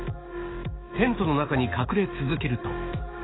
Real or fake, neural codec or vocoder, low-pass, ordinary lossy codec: fake; autoencoder, 48 kHz, 128 numbers a frame, DAC-VAE, trained on Japanese speech; 7.2 kHz; AAC, 16 kbps